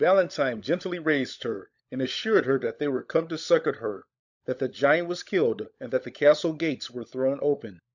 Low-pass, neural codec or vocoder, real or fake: 7.2 kHz; codec, 16 kHz, 16 kbps, FunCodec, trained on LibriTTS, 50 frames a second; fake